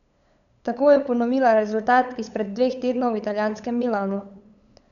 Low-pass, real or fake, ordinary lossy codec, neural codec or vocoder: 7.2 kHz; fake; none; codec, 16 kHz, 8 kbps, FunCodec, trained on LibriTTS, 25 frames a second